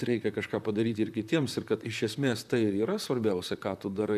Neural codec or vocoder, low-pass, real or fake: vocoder, 44.1 kHz, 128 mel bands, Pupu-Vocoder; 14.4 kHz; fake